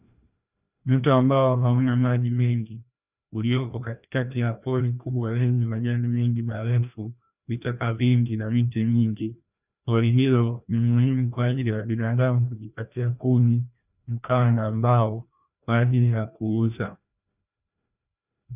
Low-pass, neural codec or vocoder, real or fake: 3.6 kHz; codec, 16 kHz, 1 kbps, FreqCodec, larger model; fake